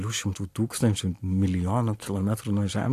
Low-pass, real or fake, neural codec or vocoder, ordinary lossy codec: 14.4 kHz; real; none; AAC, 64 kbps